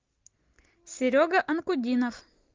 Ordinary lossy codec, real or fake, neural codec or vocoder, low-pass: Opus, 32 kbps; real; none; 7.2 kHz